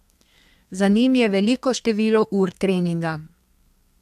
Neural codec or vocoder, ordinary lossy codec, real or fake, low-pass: codec, 32 kHz, 1.9 kbps, SNAC; AAC, 96 kbps; fake; 14.4 kHz